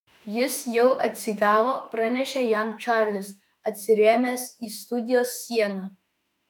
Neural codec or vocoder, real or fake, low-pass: autoencoder, 48 kHz, 32 numbers a frame, DAC-VAE, trained on Japanese speech; fake; 19.8 kHz